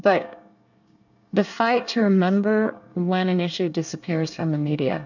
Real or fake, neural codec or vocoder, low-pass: fake; codec, 24 kHz, 1 kbps, SNAC; 7.2 kHz